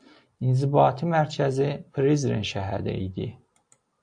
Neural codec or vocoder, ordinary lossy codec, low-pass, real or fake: none; AAC, 64 kbps; 9.9 kHz; real